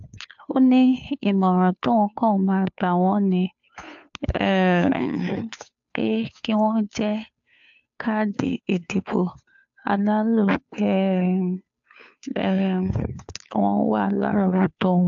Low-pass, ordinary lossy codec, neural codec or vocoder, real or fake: 7.2 kHz; AAC, 64 kbps; codec, 16 kHz, 2 kbps, FunCodec, trained on Chinese and English, 25 frames a second; fake